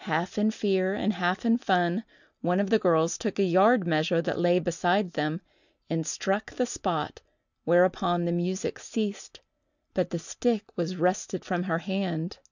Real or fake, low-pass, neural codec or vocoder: real; 7.2 kHz; none